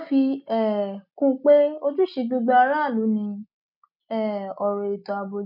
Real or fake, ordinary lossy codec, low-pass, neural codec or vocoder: real; none; 5.4 kHz; none